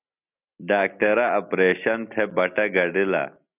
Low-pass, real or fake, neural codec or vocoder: 3.6 kHz; real; none